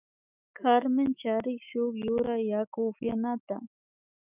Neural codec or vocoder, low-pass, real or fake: autoencoder, 48 kHz, 128 numbers a frame, DAC-VAE, trained on Japanese speech; 3.6 kHz; fake